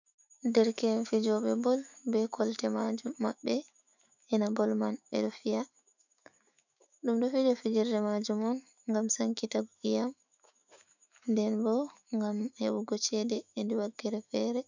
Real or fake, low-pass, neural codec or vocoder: fake; 7.2 kHz; autoencoder, 48 kHz, 128 numbers a frame, DAC-VAE, trained on Japanese speech